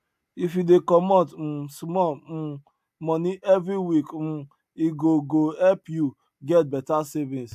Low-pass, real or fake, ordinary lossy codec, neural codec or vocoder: 14.4 kHz; real; AAC, 96 kbps; none